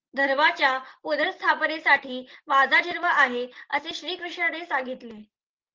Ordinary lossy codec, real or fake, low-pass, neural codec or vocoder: Opus, 16 kbps; real; 7.2 kHz; none